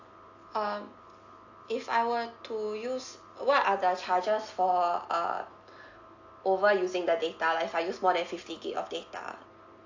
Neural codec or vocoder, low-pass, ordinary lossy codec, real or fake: none; 7.2 kHz; AAC, 48 kbps; real